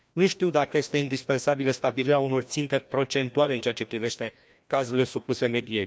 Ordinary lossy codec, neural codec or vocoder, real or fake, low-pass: none; codec, 16 kHz, 1 kbps, FreqCodec, larger model; fake; none